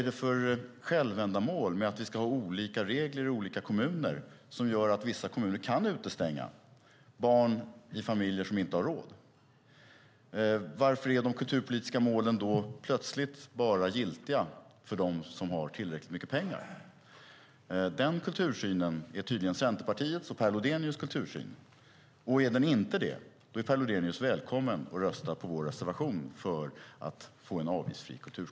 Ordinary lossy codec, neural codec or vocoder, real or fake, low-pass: none; none; real; none